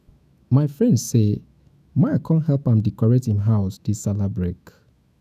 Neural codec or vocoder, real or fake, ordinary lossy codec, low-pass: autoencoder, 48 kHz, 128 numbers a frame, DAC-VAE, trained on Japanese speech; fake; none; 14.4 kHz